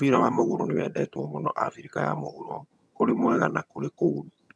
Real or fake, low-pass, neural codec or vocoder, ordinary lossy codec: fake; none; vocoder, 22.05 kHz, 80 mel bands, HiFi-GAN; none